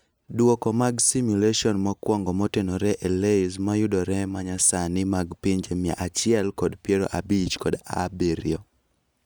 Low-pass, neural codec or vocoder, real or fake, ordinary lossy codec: none; none; real; none